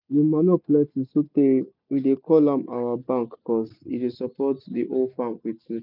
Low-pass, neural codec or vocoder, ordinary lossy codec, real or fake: 5.4 kHz; none; none; real